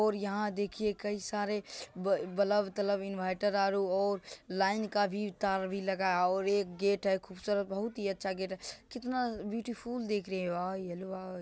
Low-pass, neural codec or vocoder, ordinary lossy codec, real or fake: none; none; none; real